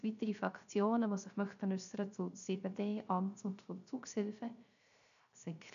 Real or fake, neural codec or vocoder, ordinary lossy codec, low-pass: fake; codec, 16 kHz, 0.3 kbps, FocalCodec; none; 7.2 kHz